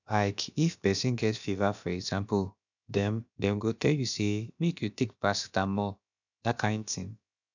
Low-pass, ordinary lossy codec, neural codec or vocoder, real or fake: 7.2 kHz; none; codec, 16 kHz, about 1 kbps, DyCAST, with the encoder's durations; fake